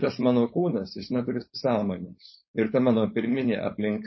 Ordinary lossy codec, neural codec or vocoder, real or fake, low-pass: MP3, 24 kbps; codec, 16 kHz, 4.8 kbps, FACodec; fake; 7.2 kHz